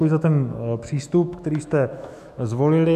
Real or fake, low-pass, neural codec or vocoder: fake; 14.4 kHz; autoencoder, 48 kHz, 128 numbers a frame, DAC-VAE, trained on Japanese speech